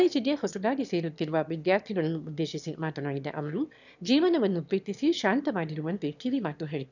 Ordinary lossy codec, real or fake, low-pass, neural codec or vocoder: none; fake; 7.2 kHz; autoencoder, 22.05 kHz, a latent of 192 numbers a frame, VITS, trained on one speaker